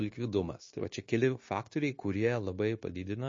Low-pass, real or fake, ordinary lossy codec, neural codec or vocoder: 7.2 kHz; fake; MP3, 32 kbps; codec, 16 kHz, 0.9 kbps, LongCat-Audio-Codec